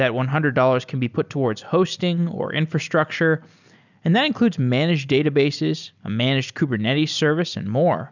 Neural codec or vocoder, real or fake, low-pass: none; real; 7.2 kHz